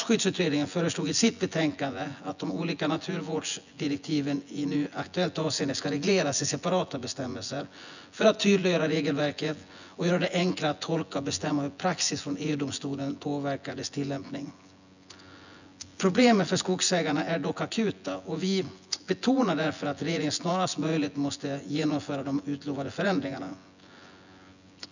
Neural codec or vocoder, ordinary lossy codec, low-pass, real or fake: vocoder, 24 kHz, 100 mel bands, Vocos; none; 7.2 kHz; fake